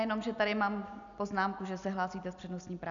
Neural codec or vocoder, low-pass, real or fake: none; 7.2 kHz; real